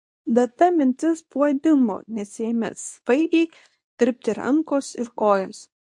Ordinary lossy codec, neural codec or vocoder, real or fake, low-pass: MP3, 64 kbps; codec, 24 kHz, 0.9 kbps, WavTokenizer, medium speech release version 2; fake; 10.8 kHz